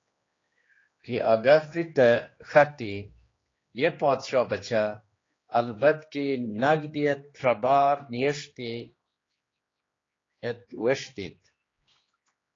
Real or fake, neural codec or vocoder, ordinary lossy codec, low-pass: fake; codec, 16 kHz, 2 kbps, X-Codec, HuBERT features, trained on general audio; AAC, 32 kbps; 7.2 kHz